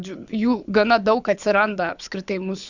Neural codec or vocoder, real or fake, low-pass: codec, 24 kHz, 6 kbps, HILCodec; fake; 7.2 kHz